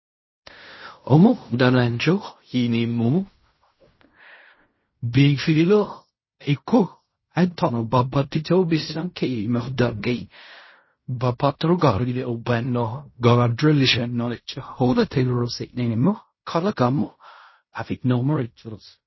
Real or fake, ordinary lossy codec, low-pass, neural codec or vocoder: fake; MP3, 24 kbps; 7.2 kHz; codec, 16 kHz in and 24 kHz out, 0.4 kbps, LongCat-Audio-Codec, fine tuned four codebook decoder